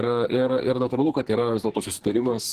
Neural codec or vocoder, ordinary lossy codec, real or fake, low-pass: codec, 44.1 kHz, 2.6 kbps, SNAC; Opus, 16 kbps; fake; 14.4 kHz